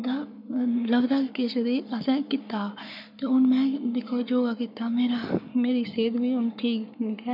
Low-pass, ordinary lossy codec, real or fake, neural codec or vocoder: 5.4 kHz; none; fake; codec, 44.1 kHz, 7.8 kbps, Pupu-Codec